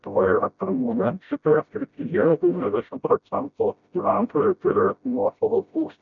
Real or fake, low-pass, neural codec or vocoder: fake; 7.2 kHz; codec, 16 kHz, 0.5 kbps, FreqCodec, smaller model